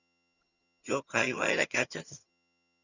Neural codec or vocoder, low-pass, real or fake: vocoder, 22.05 kHz, 80 mel bands, HiFi-GAN; 7.2 kHz; fake